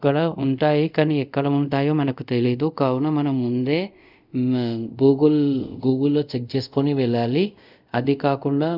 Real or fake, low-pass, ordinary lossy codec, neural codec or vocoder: fake; 5.4 kHz; none; codec, 24 kHz, 0.5 kbps, DualCodec